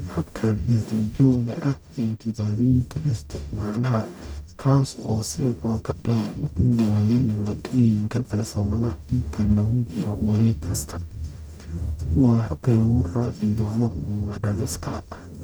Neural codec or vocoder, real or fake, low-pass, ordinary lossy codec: codec, 44.1 kHz, 0.9 kbps, DAC; fake; none; none